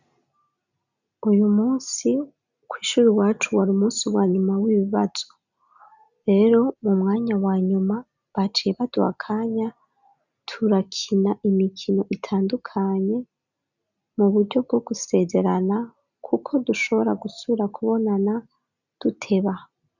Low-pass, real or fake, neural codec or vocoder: 7.2 kHz; real; none